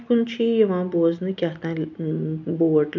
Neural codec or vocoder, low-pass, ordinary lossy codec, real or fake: none; 7.2 kHz; none; real